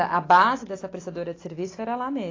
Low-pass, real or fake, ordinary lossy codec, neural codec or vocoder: 7.2 kHz; real; AAC, 32 kbps; none